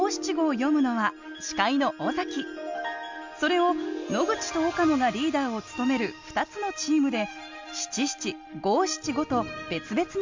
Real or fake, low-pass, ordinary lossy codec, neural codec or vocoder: real; 7.2 kHz; MP3, 64 kbps; none